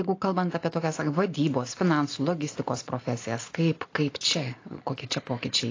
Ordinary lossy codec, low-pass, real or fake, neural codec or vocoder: AAC, 32 kbps; 7.2 kHz; real; none